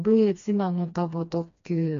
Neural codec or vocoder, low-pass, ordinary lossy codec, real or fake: codec, 16 kHz, 2 kbps, FreqCodec, smaller model; 7.2 kHz; MP3, 64 kbps; fake